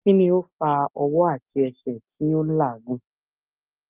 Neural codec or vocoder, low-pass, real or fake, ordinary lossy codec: none; 3.6 kHz; real; Opus, 24 kbps